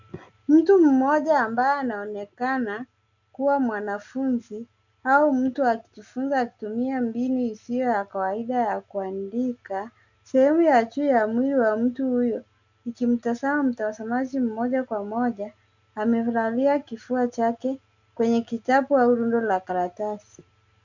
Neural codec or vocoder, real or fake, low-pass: none; real; 7.2 kHz